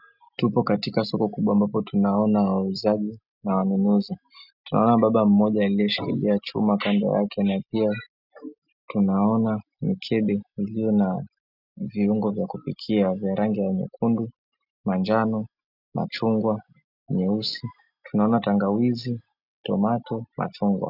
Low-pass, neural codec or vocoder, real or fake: 5.4 kHz; none; real